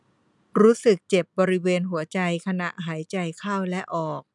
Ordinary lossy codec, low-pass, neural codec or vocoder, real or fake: none; 10.8 kHz; none; real